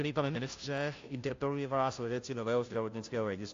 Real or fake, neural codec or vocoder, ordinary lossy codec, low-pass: fake; codec, 16 kHz, 0.5 kbps, FunCodec, trained on Chinese and English, 25 frames a second; AAC, 48 kbps; 7.2 kHz